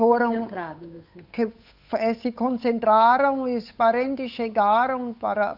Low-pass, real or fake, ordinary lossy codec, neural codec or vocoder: 5.4 kHz; fake; none; codec, 16 kHz, 8 kbps, FunCodec, trained on Chinese and English, 25 frames a second